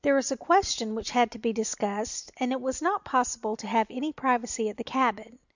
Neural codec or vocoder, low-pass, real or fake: none; 7.2 kHz; real